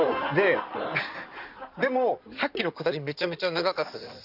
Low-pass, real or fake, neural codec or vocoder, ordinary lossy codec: 5.4 kHz; fake; codec, 16 kHz in and 24 kHz out, 2.2 kbps, FireRedTTS-2 codec; none